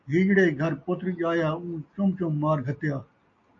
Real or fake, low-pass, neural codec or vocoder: real; 7.2 kHz; none